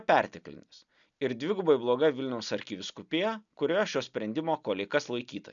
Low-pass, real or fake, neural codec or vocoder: 7.2 kHz; real; none